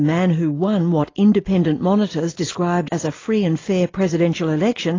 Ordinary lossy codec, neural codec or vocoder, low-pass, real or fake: AAC, 32 kbps; none; 7.2 kHz; real